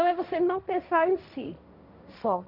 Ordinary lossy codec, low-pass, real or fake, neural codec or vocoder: none; 5.4 kHz; fake; codec, 16 kHz, 1.1 kbps, Voila-Tokenizer